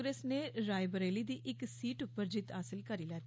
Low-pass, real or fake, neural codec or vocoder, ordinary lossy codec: none; real; none; none